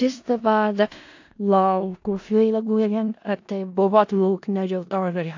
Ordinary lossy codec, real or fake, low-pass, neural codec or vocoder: AAC, 48 kbps; fake; 7.2 kHz; codec, 16 kHz in and 24 kHz out, 0.4 kbps, LongCat-Audio-Codec, four codebook decoder